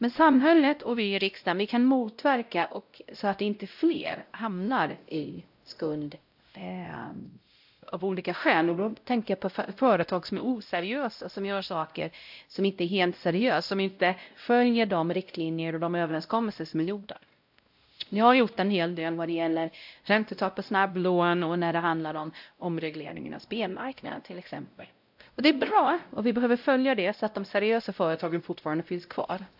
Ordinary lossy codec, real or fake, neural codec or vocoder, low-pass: AAC, 48 kbps; fake; codec, 16 kHz, 0.5 kbps, X-Codec, WavLM features, trained on Multilingual LibriSpeech; 5.4 kHz